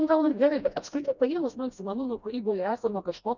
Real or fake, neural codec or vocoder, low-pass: fake; codec, 16 kHz, 1 kbps, FreqCodec, smaller model; 7.2 kHz